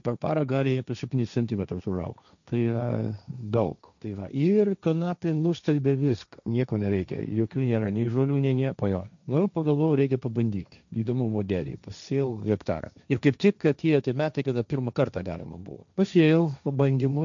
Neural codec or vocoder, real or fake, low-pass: codec, 16 kHz, 1.1 kbps, Voila-Tokenizer; fake; 7.2 kHz